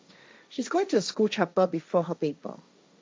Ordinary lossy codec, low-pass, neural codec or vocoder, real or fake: none; none; codec, 16 kHz, 1.1 kbps, Voila-Tokenizer; fake